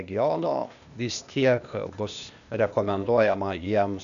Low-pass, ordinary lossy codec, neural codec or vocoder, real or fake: 7.2 kHz; MP3, 96 kbps; codec, 16 kHz, 0.8 kbps, ZipCodec; fake